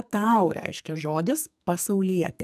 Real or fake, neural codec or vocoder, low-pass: fake; codec, 44.1 kHz, 2.6 kbps, SNAC; 14.4 kHz